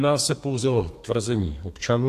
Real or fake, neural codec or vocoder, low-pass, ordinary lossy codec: fake; codec, 32 kHz, 1.9 kbps, SNAC; 14.4 kHz; AAC, 64 kbps